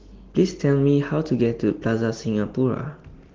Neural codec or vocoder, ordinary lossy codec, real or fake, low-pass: none; Opus, 16 kbps; real; 7.2 kHz